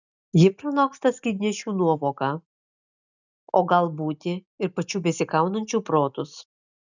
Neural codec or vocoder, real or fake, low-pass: none; real; 7.2 kHz